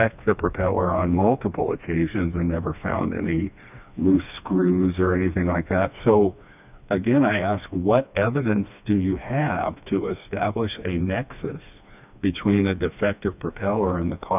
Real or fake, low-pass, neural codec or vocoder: fake; 3.6 kHz; codec, 16 kHz, 2 kbps, FreqCodec, smaller model